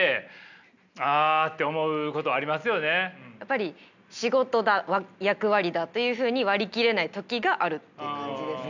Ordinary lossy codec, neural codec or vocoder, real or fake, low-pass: none; none; real; 7.2 kHz